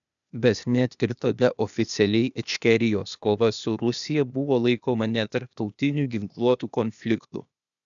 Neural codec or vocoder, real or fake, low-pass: codec, 16 kHz, 0.8 kbps, ZipCodec; fake; 7.2 kHz